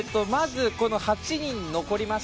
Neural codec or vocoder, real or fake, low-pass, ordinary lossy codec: none; real; none; none